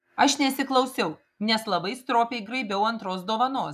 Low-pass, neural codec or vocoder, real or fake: 14.4 kHz; none; real